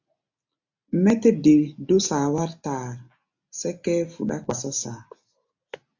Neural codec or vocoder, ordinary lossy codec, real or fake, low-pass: none; AAC, 48 kbps; real; 7.2 kHz